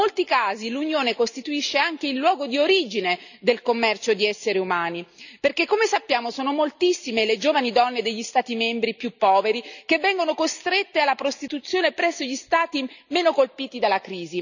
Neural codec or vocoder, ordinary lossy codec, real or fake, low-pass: none; none; real; 7.2 kHz